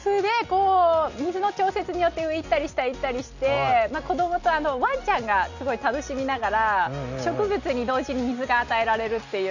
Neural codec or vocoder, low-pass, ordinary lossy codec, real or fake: none; 7.2 kHz; none; real